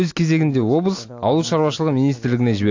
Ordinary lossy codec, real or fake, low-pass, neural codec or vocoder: AAC, 48 kbps; real; 7.2 kHz; none